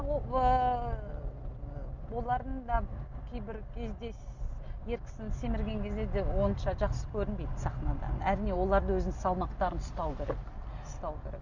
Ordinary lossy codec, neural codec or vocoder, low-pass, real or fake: none; none; 7.2 kHz; real